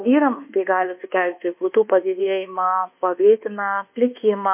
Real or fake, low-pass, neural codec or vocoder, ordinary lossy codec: fake; 3.6 kHz; codec, 24 kHz, 1.2 kbps, DualCodec; MP3, 32 kbps